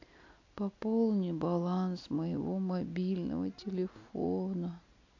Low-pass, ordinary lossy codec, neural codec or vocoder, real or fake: 7.2 kHz; AAC, 48 kbps; none; real